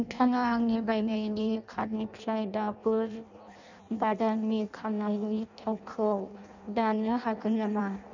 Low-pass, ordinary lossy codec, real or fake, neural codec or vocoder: 7.2 kHz; none; fake; codec, 16 kHz in and 24 kHz out, 0.6 kbps, FireRedTTS-2 codec